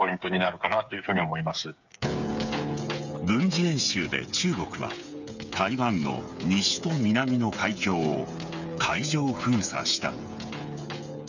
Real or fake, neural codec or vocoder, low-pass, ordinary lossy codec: fake; codec, 16 kHz, 8 kbps, FreqCodec, smaller model; 7.2 kHz; none